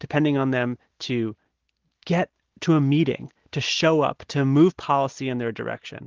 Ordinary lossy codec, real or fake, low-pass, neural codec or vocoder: Opus, 16 kbps; real; 7.2 kHz; none